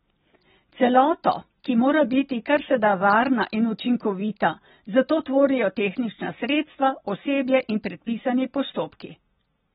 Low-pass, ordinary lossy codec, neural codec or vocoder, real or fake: 19.8 kHz; AAC, 16 kbps; none; real